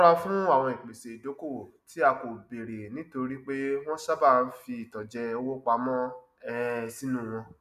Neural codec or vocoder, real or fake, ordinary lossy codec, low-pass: none; real; none; 14.4 kHz